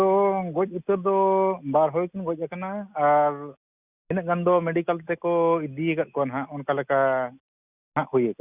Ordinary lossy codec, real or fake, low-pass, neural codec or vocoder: Opus, 64 kbps; real; 3.6 kHz; none